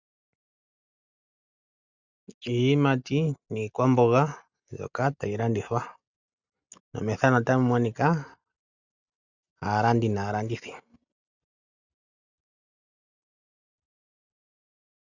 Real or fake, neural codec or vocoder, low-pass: real; none; 7.2 kHz